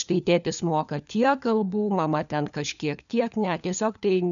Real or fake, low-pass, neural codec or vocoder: fake; 7.2 kHz; codec, 16 kHz, 4 kbps, FunCodec, trained on LibriTTS, 50 frames a second